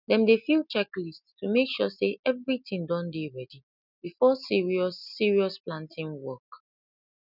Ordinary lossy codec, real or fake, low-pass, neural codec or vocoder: none; real; 5.4 kHz; none